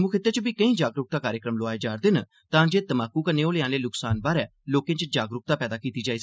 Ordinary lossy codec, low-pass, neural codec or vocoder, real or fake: none; none; none; real